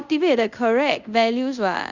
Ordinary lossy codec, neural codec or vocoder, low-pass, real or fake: none; codec, 24 kHz, 0.5 kbps, DualCodec; 7.2 kHz; fake